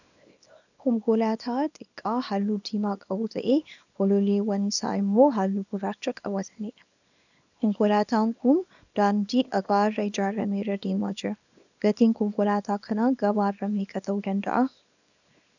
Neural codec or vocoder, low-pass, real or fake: codec, 24 kHz, 0.9 kbps, WavTokenizer, small release; 7.2 kHz; fake